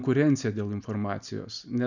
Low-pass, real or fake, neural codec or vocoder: 7.2 kHz; real; none